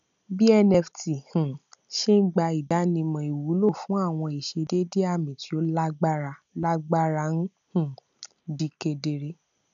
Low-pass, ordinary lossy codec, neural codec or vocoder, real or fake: 7.2 kHz; none; none; real